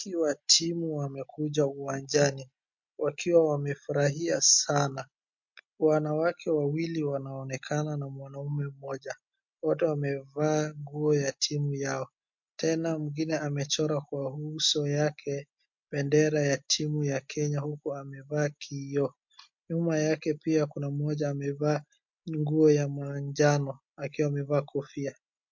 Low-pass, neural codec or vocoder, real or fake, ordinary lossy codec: 7.2 kHz; none; real; MP3, 48 kbps